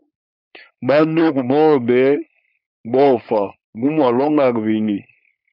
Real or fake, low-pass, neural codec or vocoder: fake; 5.4 kHz; codec, 16 kHz, 4.8 kbps, FACodec